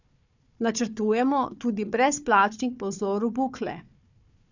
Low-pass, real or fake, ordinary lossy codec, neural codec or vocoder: 7.2 kHz; fake; none; codec, 16 kHz, 4 kbps, FunCodec, trained on Chinese and English, 50 frames a second